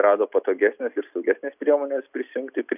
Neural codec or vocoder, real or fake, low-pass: none; real; 3.6 kHz